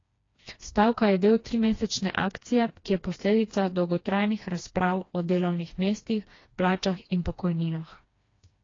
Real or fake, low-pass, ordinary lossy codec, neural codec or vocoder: fake; 7.2 kHz; AAC, 32 kbps; codec, 16 kHz, 2 kbps, FreqCodec, smaller model